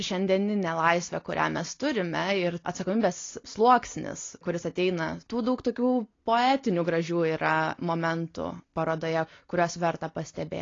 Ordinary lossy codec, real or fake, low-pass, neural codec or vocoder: AAC, 32 kbps; real; 7.2 kHz; none